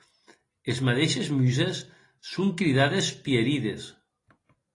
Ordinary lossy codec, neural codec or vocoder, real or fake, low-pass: AAC, 32 kbps; none; real; 10.8 kHz